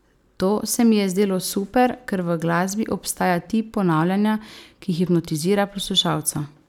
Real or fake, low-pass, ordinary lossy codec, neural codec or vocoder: real; 19.8 kHz; none; none